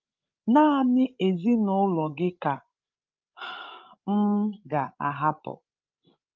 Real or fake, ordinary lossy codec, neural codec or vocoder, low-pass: fake; Opus, 32 kbps; codec, 16 kHz, 16 kbps, FreqCodec, larger model; 7.2 kHz